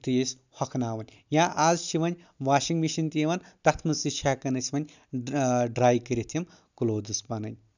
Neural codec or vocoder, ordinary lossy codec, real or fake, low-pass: none; none; real; 7.2 kHz